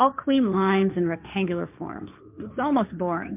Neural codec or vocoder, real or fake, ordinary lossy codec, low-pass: codec, 16 kHz, 1.1 kbps, Voila-Tokenizer; fake; MP3, 32 kbps; 3.6 kHz